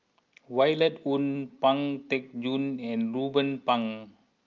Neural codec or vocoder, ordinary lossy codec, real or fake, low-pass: none; Opus, 24 kbps; real; 7.2 kHz